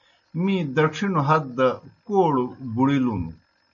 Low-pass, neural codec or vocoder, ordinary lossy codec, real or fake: 7.2 kHz; none; MP3, 48 kbps; real